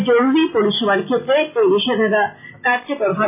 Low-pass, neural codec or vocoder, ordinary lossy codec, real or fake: 3.6 kHz; none; MP3, 24 kbps; real